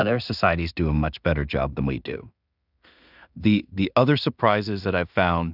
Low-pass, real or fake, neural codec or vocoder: 5.4 kHz; fake; codec, 16 kHz in and 24 kHz out, 0.4 kbps, LongCat-Audio-Codec, two codebook decoder